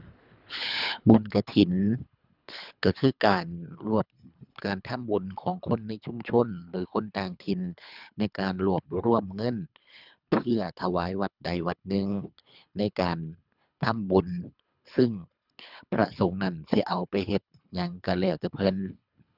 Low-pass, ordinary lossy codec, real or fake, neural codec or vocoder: 5.4 kHz; none; fake; codec, 24 kHz, 3 kbps, HILCodec